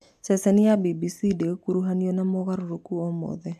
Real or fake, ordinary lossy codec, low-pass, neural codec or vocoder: real; AAC, 96 kbps; 14.4 kHz; none